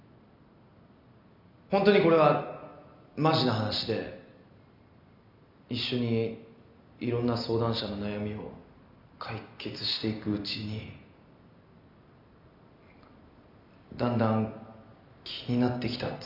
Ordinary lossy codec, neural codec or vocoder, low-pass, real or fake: MP3, 48 kbps; none; 5.4 kHz; real